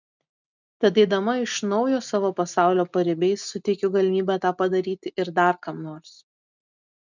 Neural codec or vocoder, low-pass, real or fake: none; 7.2 kHz; real